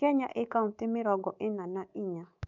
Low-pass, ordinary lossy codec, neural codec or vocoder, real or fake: 7.2 kHz; none; codec, 24 kHz, 3.1 kbps, DualCodec; fake